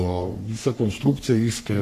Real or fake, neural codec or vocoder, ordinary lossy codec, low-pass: fake; codec, 44.1 kHz, 3.4 kbps, Pupu-Codec; AAC, 96 kbps; 14.4 kHz